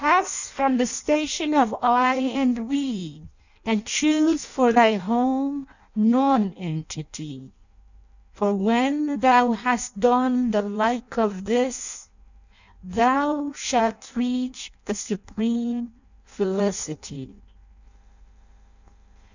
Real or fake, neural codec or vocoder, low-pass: fake; codec, 16 kHz in and 24 kHz out, 0.6 kbps, FireRedTTS-2 codec; 7.2 kHz